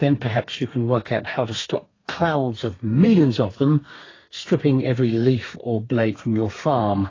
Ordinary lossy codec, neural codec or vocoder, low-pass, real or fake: AAC, 32 kbps; codec, 32 kHz, 1.9 kbps, SNAC; 7.2 kHz; fake